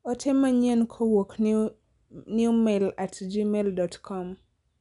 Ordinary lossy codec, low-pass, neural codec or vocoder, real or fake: none; 10.8 kHz; none; real